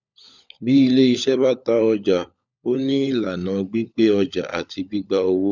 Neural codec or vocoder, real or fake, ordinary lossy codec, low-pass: codec, 16 kHz, 16 kbps, FunCodec, trained on LibriTTS, 50 frames a second; fake; none; 7.2 kHz